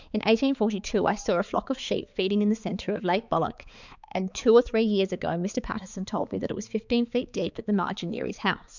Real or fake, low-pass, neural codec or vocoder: fake; 7.2 kHz; codec, 16 kHz, 4 kbps, X-Codec, HuBERT features, trained on balanced general audio